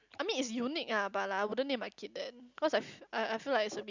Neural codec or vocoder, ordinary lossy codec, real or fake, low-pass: none; Opus, 64 kbps; real; 7.2 kHz